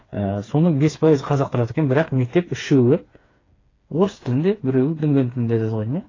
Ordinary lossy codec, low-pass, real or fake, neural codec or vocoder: AAC, 32 kbps; 7.2 kHz; fake; codec, 16 kHz, 4 kbps, FreqCodec, smaller model